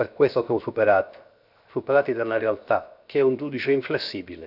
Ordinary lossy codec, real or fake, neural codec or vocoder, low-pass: none; fake; codec, 16 kHz, 0.7 kbps, FocalCodec; 5.4 kHz